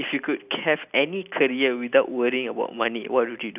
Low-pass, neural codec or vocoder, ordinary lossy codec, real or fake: 3.6 kHz; none; none; real